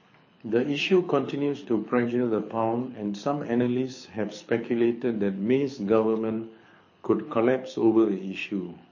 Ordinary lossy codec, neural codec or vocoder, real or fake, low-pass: MP3, 32 kbps; codec, 24 kHz, 6 kbps, HILCodec; fake; 7.2 kHz